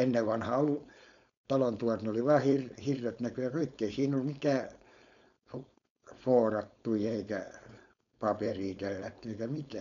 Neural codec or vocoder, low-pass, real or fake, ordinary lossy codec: codec, 16 kHz, 4.8 kbps, FACodec; 7.2 kHz; fake; none